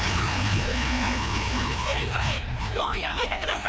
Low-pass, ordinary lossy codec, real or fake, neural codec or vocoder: none; none; fake; codec, 16 kHz, 1 kbps, FreqCodec, larger model